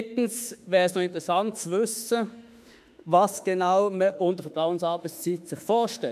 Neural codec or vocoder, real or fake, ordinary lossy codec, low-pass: autoencoder, 48 kHz, 32 numbers a frame, DAC-VAE, trained on Japanese speech; fake; MP3, 96 kbps; 14.4 kHz